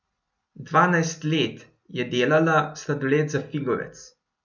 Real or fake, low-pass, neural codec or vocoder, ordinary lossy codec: real; 7.2 kHz; none; none